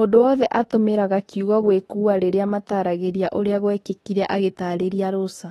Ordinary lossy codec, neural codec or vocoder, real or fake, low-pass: AAC, 32 kbps; autoencoder, 48 kHz, 32 numbers a frame, DAC-VAE, trained on Japanese speech; fake; 19.8 kHz